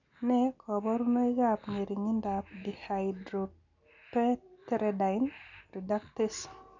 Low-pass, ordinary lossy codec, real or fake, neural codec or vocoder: 7.2 kHz; none; real; none